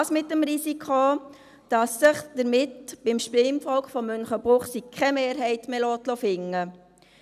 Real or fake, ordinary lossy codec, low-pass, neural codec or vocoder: real; none; 14.4 kHz; none